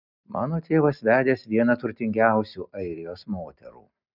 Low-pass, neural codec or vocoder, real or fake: 5.4 kHz; none; real